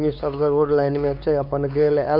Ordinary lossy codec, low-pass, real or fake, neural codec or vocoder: none; 5.4 kHz; fake; codec, 16 kHz, 4 kbps, X-Codec, WavLM features, trained on Multilingual LibriSpeech